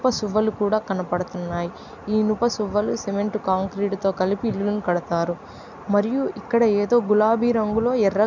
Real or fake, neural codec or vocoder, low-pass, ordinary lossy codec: real; none; 7.2 kHz; none